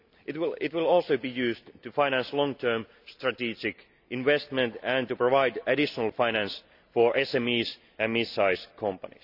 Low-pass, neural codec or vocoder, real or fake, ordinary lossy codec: 5.4 kHz; none; real; none